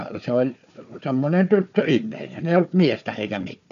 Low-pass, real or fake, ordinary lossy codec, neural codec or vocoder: 7.2 kHz; fake; none; codec, 16 kHz, 4 kbps, FunCodec, trained on Chinese and English, 50 frames a second